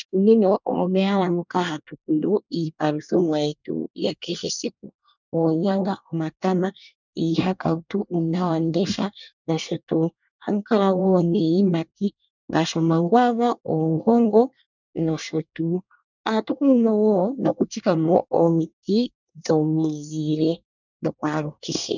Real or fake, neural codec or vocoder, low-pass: fake; codec, 24 kHz, 1 kbps, SNAC; 7.2 kHz